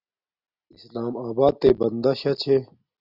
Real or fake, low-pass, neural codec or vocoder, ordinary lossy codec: real; 5.4 kHz; none; Opus, 64 kbps